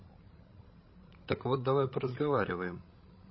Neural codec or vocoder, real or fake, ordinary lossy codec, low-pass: codec, 16 kHz, 16 kbps, FreqCodec, larger model; fake; MP3, 24 kbps; 7.2 kHz